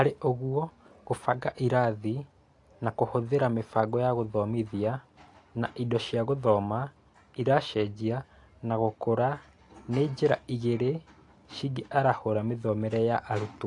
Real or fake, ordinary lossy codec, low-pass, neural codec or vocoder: real; AAC, 48 kbps; 10.8 kHz; none